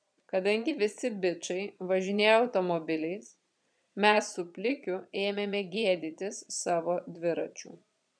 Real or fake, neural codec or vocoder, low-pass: fake; vocoder, 22.05 kHz, 80 mel bands, Vocos; 9.9 kHz